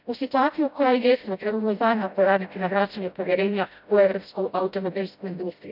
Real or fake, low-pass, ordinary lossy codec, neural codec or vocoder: fake; 5.4 kHz; none; codec, 16 kHz, 0.5 kbps, FreqCodec, smaller model